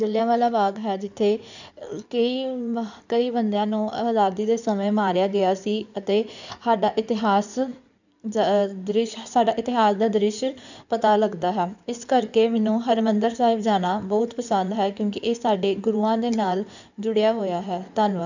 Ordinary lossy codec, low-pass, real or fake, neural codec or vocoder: none; 7.2 kHz; fake; codec, 16 kHz in and 24 kHz out, 2.2 kbps, FireRedTTS-2 codec